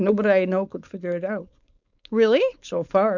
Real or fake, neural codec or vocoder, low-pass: fake; codec, 16 kHz, 4.8 kbps, FACodec; 7.2 kHz